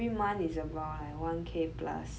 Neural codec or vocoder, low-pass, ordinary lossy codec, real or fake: none; none; none; real